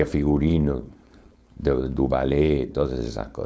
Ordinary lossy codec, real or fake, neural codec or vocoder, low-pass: none; fake; codec, 16 kHz, 4.8 kbps, FACodec; none